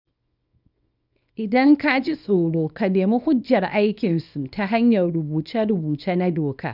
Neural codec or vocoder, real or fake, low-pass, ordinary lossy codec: codec, 24 kHz, 0.9 kbps, WavTokenizer, small release; fake; 5.4 kHz; none